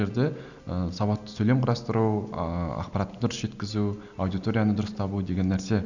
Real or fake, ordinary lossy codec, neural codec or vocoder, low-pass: real; none; none; 7.2 kHz